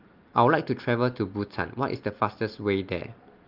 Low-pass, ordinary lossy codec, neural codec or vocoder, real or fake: 5.4 kHz; Opus, 32 kbps; none; real